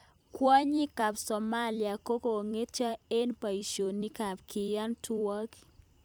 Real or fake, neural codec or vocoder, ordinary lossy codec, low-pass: fake; vocoder, 44.1 kHz, 128 mel bands every 256 samples, BigVGAN v2; none; none